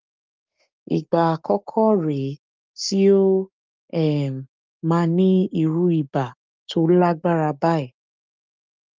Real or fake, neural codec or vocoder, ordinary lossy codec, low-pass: fake; codec, 16 kHz, 6 kbps, DAC; Opus, 32 kbps; 7.2 kHz